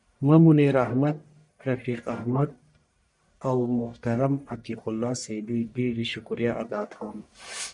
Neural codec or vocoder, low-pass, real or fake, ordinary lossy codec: codec, 44.1 kHz, 1.7 kbps, Pupu-Codec; 10.8 kHz; fake; MP3, 96 kbps